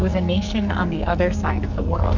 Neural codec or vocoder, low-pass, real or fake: codec, 44.1 kHz, 2.6 kbps, SNAC; 7.2 kHz; fake